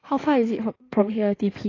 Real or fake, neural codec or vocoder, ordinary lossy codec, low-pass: fake; codec, 16 kHz in and 24 kHz out, 2.2 kbps, FireRedTTS-2 codec; AAC, 32 kbps; 7.2 kHz